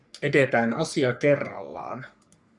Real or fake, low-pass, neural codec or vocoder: fake; 10.8 kHz; codec, 44.1 kHz, 3.4 kbps, Pupu-Codec